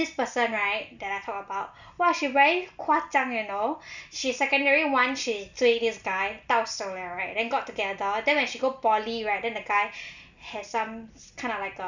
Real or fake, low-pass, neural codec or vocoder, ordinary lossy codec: real; 7.2 kHz; none; none